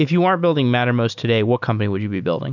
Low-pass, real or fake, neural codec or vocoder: 7.2 kHz; real; none